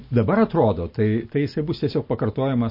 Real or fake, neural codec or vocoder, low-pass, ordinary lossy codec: real; none; 5.4 kHz; MP3, 32 kbps